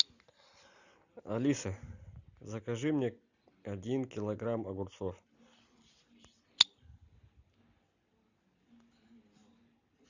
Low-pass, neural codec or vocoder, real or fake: 7.2 kHz; none; real